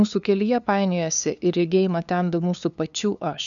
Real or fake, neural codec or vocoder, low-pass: fake; codec, 16 kHz, 2 kbps, FunCodec, trained on LibriTTS, 25 frames a second; 7.2 kHz